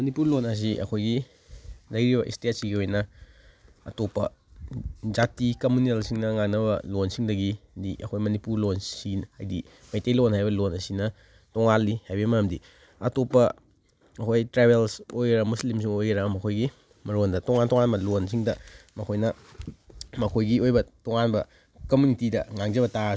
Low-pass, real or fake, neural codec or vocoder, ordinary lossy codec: none; real; none; none